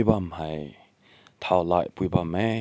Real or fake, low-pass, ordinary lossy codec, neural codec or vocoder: real; none; none; none